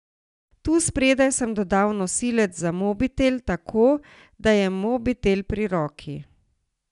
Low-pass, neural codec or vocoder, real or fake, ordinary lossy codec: 10.8 kHz; none; real; none